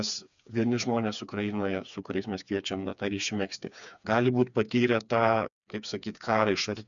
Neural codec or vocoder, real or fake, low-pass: codec, 16 kHz, 4 kbps, FreqCodec, smaller model; fake; 7.2 kHz